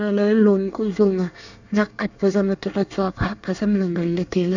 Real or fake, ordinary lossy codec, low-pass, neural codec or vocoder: fake; none; 7.2 kHz; codec, 24 kHz, 1 kbps, SNAC